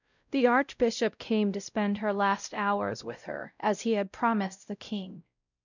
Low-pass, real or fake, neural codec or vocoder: 7.2 kHz; fake; codec, 16 kHz, 0.5 kbps, X-Codec, WavLM features, trained on Multilingual LibriSpeech